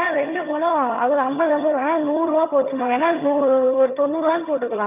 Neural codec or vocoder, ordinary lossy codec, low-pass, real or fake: vocoder, 22.05 kHz, 80 mel bands, HiFi-GAN; none; 3.6 kHz; fake